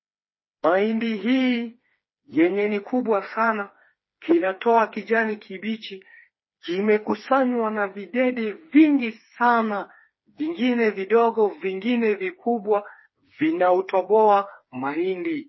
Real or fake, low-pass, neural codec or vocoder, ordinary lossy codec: fake; 7.2 kHz; codec, 16 kHz, 4 kbps, FreqCodec, smaller model; MP3, 24 kbps